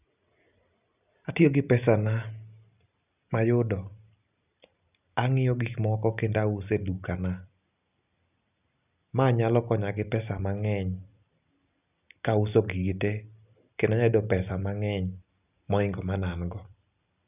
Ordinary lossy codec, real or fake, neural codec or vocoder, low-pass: none; real; none; 3.6 kHz